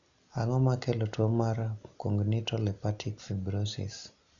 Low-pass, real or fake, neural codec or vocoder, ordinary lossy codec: 7.2 kHz; real; none; none